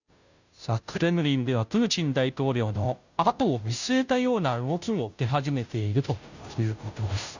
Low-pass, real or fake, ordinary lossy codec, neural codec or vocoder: 7.2 kHz; fake; none; codec, 16 kHz, 0.5 kbps, FunCodec, trained on Chinese and English, 25 frames a second